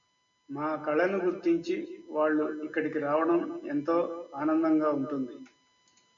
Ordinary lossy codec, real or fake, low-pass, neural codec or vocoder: MP3, 48 kbps; real; 7.2 kHz; none